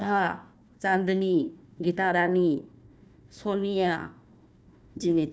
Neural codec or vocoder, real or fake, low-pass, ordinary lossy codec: codec, 16 kHz, 1 kbps, FunCodec, trained on Chinese and English, 50 frames a second; fake; none; none